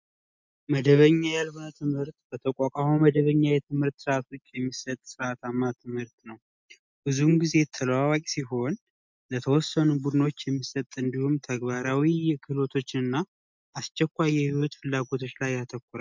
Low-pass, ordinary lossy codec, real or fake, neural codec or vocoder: 7.2 kHz; MP3, 64 kbps; real; none